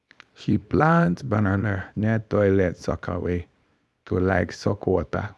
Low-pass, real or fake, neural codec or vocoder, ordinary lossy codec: none; fake; codec, 24 kHz, 0.9 kbps, WavTokenizer, small release; none